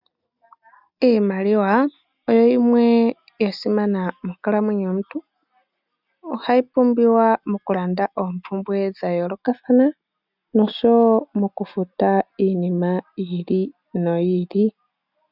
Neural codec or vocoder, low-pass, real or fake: none; 5.4 kHz; real